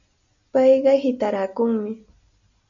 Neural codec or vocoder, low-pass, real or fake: none; 7.2 kHz; real